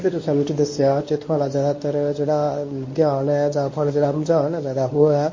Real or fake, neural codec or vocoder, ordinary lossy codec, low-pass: fake; codec, 24 kHz, 0.9 kbps, WavTokenizer, medium speech release version 1; MP3, 32 kbps; 7.2 kHz